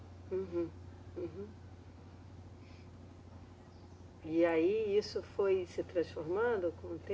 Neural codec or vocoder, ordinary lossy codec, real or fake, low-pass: none; none; real; none